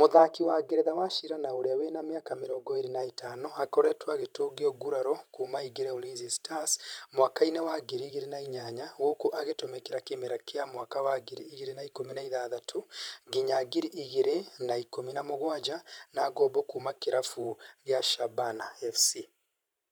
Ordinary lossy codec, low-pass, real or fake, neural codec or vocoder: none; none; fake; vocoder, 44.1 kHz, 128 mel bands every 512 samples, BigVGAN v2